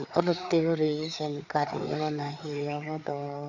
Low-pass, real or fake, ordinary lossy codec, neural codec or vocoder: 7.2 kHz; fake; none; codec, 16 kHz, 4 kbps, FreqCodec, larger model